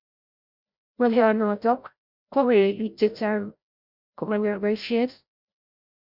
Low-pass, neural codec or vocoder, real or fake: 5.4 kHz; codec, 16 kHz, 0.5 kbps, FreqCodec, larger model; fake